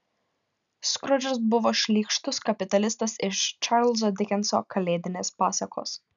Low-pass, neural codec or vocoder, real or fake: 7.2 kHz; none; real